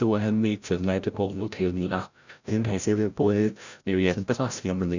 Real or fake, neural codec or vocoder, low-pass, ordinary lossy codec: fake; codec, 16 kHz, 0.5 kbps, FreqCodec, larger model; 7.2 kHz; none